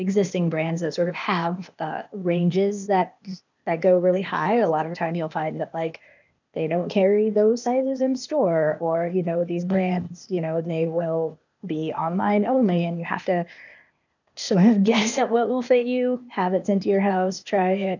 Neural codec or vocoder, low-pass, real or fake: codec, 16 kHz, 0.8 kbps, ZipCodec; 7.2 kHz; fake